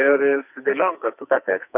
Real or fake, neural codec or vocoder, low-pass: fake; codec, 44.1 kHz, 2.6 kbps, SNAC; 3.6 kHz